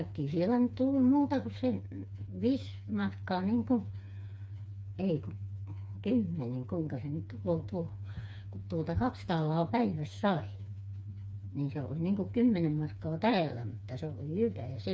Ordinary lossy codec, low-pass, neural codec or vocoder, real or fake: none; none; codec, 16 kHz, 4 kbps, FreqCodec, smaller model; fake